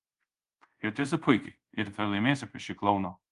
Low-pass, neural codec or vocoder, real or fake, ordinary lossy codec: 10.8 kHz; codec, 24 kHz, 0.5 kbps, DualCodec; fake; Opus, 32 kbps